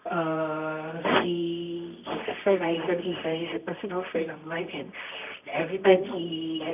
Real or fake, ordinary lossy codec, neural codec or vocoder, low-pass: fake; none; codec, 24 kHz, 0.9 kbps, WavTokenizer, medium music audio release; 3.6 kHz